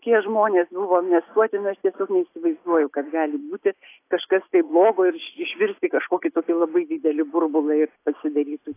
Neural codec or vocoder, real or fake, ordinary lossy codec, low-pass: none; real; AAC, 24 kbps; 3.6 kHz